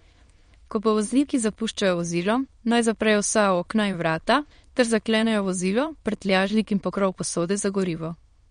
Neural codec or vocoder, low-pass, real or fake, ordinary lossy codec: autoencoder, 22.05 kHz, a latent of 192 numbers a frame, VITS, trained on many speakers; 9.9 kHz; fake; MP3, 48 kbps